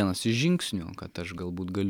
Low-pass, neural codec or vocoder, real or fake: 19.8 kHz; none; real